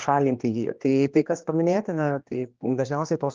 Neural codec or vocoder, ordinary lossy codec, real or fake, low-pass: codec, 16 kHz, 2 kbps, X-Codec, HuBERT features, trained on general audio; Opus, 24 kbps; fake; 7.2 kHz